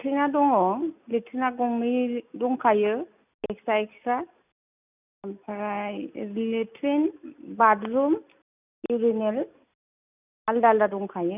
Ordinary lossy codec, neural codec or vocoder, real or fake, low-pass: none; none; real; 3.6 kHz